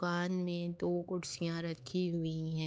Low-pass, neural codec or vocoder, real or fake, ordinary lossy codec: none; codec, 16 kHz, 4 kbps, X-Codec, HuBERT features, trained on LibriSpeech; fake; none